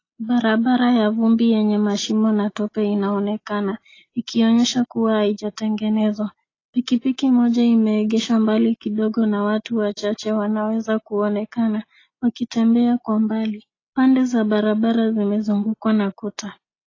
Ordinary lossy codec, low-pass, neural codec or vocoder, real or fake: AAC, 32 kbps; 7.2 kHz; none; real